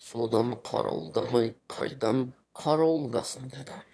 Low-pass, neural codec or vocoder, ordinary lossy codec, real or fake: none; autoencoder, 22.05 kHz, a latent of 192 numbers a frame, VITS, trained on one speaker; none; fake